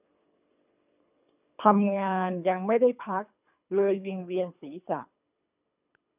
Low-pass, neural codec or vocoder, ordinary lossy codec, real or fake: 3.6 kHz; codec, 24 kHz, 3 kbps, HILCodec; none; fake